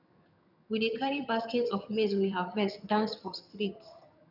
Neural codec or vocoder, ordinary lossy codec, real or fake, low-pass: vocoder, 22.05 kHz, 80 mel bands, HiFi-GAN; none; fake; 5.4 kHz